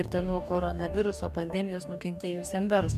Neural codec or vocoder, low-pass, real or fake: codec, 44.1 kHz, 2.6 kbps, DAC; 14.4 kHz; fake